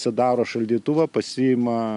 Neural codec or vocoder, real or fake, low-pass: none; real; 10.8 kHz